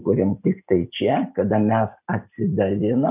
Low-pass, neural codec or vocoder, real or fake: 3.6 kHz; none; real